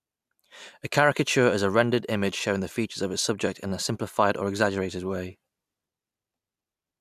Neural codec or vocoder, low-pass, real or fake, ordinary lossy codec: none; 14.4 kHz; real; MP3, 96 kbps